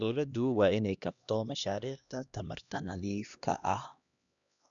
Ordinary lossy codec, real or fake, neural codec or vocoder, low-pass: none; fake; codec, 16 kHz, 1 kbps, X-Codec, HuBERT features, trained on LibriSpeech; 7.2 kHz